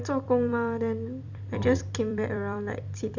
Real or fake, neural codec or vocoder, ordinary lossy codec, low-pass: fake; vocoder, 44.1 kHz, 128 mel bands every 256 samples, BigVGAN v2; Opus, 64 kbps; 7.2 kHz